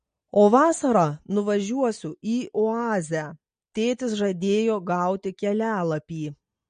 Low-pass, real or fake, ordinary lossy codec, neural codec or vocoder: 9.9 kHz; real; MP3, 48 kbps; none